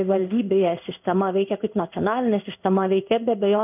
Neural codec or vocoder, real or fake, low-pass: codec, 16 kHz in and 24 kHz out, 1 kbps, XY-Tokenizer; fake; 3.6 kHz